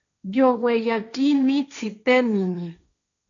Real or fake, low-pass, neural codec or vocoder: fake; 7.2 kHz; codec, 16 kHz, 1.1 kbps, Voila-Tokenizer